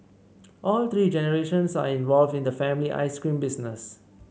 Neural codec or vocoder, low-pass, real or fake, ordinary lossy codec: none; none; real; none